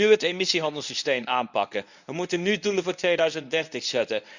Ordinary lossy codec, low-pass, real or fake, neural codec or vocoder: none; 7.2 kHz; fake; codec, 24 kHz, 0.9 kbps, WavTokenizer, medium speech release version 1